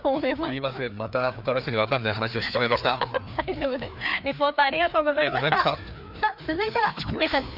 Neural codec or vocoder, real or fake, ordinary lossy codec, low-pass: codec, 16 kHz, 2 kbps, FreqCodec, larger model; fake; none; 5.4 kHz